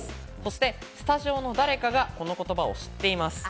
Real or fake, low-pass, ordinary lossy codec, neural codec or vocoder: real; none; none; none